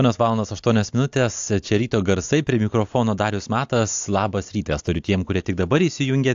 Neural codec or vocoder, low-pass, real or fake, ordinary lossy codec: none; 7.2 kHz; real; AAC, 64 kbps